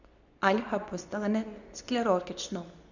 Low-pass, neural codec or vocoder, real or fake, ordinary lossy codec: 7.2 kHz; codec, 24 kHz, 0.9 kbps, WavTokenizer, medium speech release version 2; fake; none